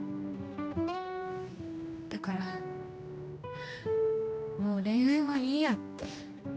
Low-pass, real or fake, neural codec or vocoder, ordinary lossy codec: none; fake; codec, 16 kHz, 1 kbps, X-Codec, HuBERT features, trained on general audio; none